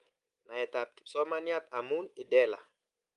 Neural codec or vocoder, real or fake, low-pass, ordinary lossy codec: codec, 24 kHz, 3.1 kbps, DualCodec; fake; 10.8 kHz; Opus, 32 kbps